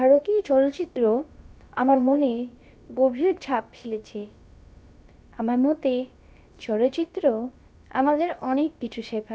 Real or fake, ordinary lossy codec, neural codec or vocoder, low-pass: fake; none; codec, 16 kHz, about 1 kbps, DyCAST, with the encoder's durations; none